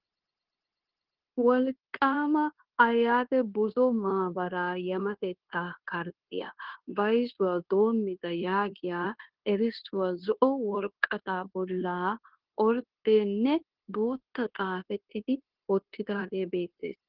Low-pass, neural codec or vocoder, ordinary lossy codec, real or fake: 5.4 kHz; codec, 16 kHz, 0.9 kbps, LongCat-Audio-Codec; Opus, 16 kbps; fake